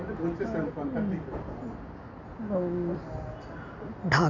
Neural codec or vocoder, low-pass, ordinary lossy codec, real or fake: none; 7.2 kHz; none; real